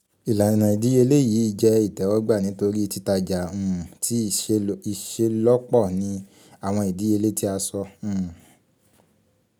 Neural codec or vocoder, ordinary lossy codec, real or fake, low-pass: none; none; real; none